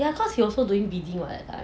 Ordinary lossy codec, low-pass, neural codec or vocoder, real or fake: none; none; none; real